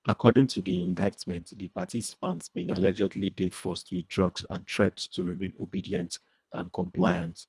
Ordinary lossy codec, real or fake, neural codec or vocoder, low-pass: none; fake; codec, 24 kHz, 1.5 kbps, HILCodec; none